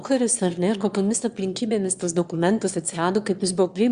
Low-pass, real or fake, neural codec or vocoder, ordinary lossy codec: 9.9 kHz; fake; autoencoder, 22.05 kHz, a latent of 192 numbers a frame, VITS, trained on one speaker; Opus, 64 kbps